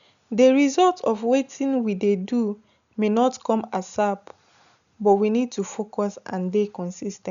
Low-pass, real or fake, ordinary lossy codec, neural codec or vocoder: 7.2 kHz; real; none; none